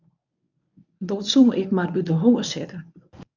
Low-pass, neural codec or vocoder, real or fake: 7.2 kHz; codec, 24 kHz, 0.9 kbps, WavTokenizer, medium speech release version 1; fake